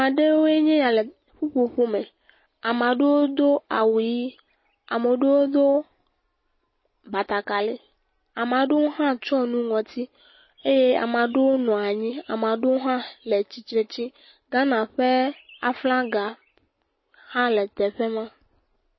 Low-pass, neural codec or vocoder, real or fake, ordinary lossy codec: 7.2 kHz; none; real; MP3, 24 kbps